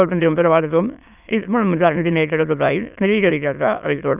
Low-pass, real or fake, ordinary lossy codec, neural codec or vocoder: 3.6 kHz; fake; none; autoencoder, 22.05 kHz, a latent of 192 numbers a frame, VITS, trained on many speakers